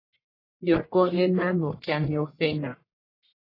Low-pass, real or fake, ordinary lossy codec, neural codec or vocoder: 5.4 kHz; fake; AAC, 24 kbps; codec, 44.1 kHz, 1.7 kbps, Pupu-Codec